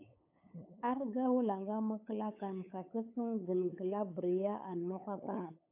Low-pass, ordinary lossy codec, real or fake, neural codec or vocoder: 3.6 kHz; AAC, 32 kbps; fake; codec, 16 kHz, 8 kbps, FunCodec, trained on LibriTTS, 25 frames a second